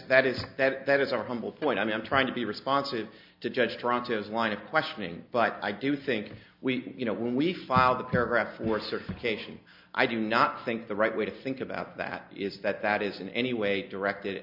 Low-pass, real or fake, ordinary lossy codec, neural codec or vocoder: 5.4 kHz; real; MP3, 48 kbps; none